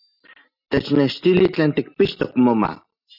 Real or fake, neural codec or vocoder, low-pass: real; none; 5.4 kHz